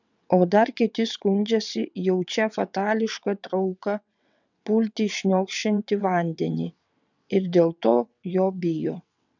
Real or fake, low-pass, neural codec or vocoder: fake; 7.2 kHz; vocoder, 22.05 kHz, 80 mel bands, WaveNeXt